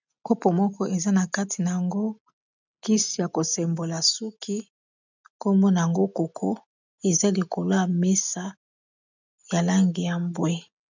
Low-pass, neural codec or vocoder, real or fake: 7.2 kHz; none; real